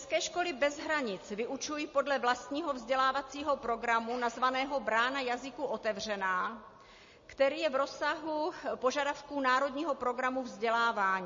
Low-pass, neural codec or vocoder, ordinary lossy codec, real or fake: 7.2 kHz; none; MP3, 32 kbps; real